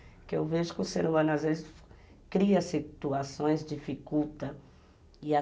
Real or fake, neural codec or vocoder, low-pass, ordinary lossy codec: real; none; none; none